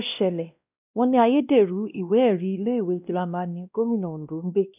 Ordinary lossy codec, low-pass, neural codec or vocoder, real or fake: none; 3.6 kHz; codec, 16 kHz, 1 kbps, X-Codec, WavLM features, trained on Multilingual LibriSpeech; fake